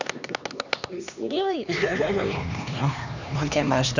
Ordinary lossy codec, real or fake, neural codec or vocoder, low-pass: none; fake; codec, 16 kHz, 2 kbps, X-Codec, HuBERT features, trained on LibriSpeech; 7.2 kHz